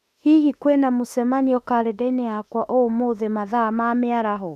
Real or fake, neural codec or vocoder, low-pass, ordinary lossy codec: fake; autoencoder, 48 kHz, 32 numbers a frame, DAC-VAE, trained on Japanese speech; 14.4 kHz; none